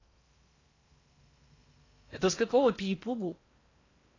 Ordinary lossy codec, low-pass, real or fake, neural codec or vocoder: AAC, 32 kbps; 7.2 kHz; fake; codec, 16 kHz in and 24 kHz out, 0.8 kbps, FocalCodec, streaming, 65536 codes